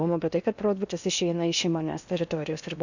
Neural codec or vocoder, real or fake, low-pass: codec, 16 kHz in and 24 kHz out, 0.8 kbps, FocalCodec, streaming, 65536 codes; fake; 7.2 kHz